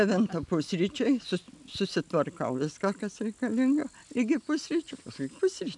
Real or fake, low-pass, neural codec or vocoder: real; 10.8 kHz; none